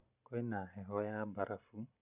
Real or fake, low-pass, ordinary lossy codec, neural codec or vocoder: real; 3.6 kHz; none; none